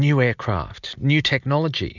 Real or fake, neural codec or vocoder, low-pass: real; none; 7.2 kHz